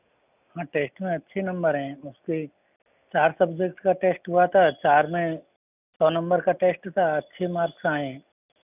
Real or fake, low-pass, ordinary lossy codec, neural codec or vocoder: real; 3.6 kHz; none; none